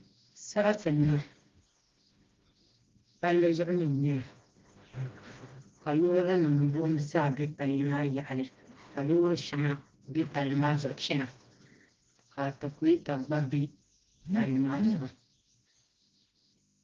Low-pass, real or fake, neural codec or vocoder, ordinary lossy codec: 7.2 kHz; fake; codec, 16 kHz, 1 kbps, FreqCodec, smaller model; Opus, 32 kbps